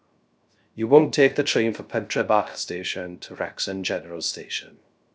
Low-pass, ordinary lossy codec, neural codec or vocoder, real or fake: none; none; codec, 16 kHz, 0.3 kbps, FocalCodec; fake